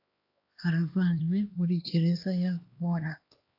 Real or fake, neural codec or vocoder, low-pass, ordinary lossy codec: fake; codec, 16 kHz, 2 kbps, X-Codec, HuBERT features, trained on LibriSpeech; 5.4 kHz; AAC, 32 kbps